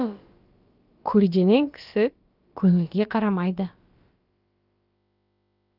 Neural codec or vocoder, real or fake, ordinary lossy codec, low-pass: codec, 16 kHz, about 1 kbps, DyCAST, with the encoder's durations; fake; Opus, 24 kbps; 5.4 kHz